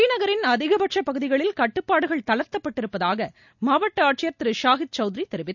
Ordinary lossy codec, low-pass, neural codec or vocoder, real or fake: none; 7.2 kHz; none; real